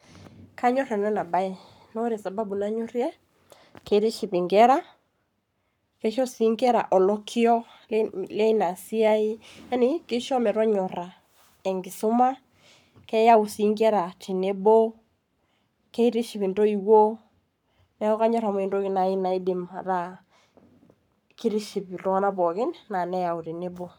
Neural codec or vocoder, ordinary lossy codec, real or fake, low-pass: codec, 44.1 kHz, 7.8 kbps, Pupu-Codec; none; fake; 19.8 kHz